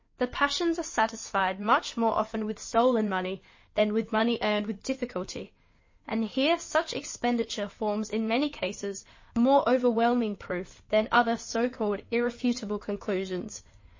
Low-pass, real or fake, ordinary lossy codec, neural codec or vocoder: 7.2 kHz; fake; MP3, 32 kbps; codec, 16 kHz in and 24 kHz out, 2.2 kbps, FireRedTTS-2 codec